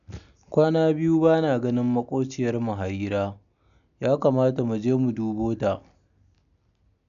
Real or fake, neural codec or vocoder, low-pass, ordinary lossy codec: real; none; 7.2 kHz; none